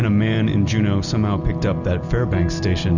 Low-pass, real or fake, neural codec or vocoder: 7.2 kHz; real; none